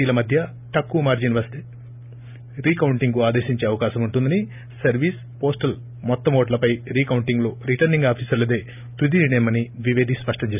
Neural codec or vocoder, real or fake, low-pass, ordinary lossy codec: none; real; 3.6 kHz; none